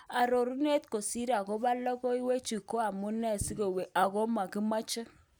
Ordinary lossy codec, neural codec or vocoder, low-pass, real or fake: none; none; none; real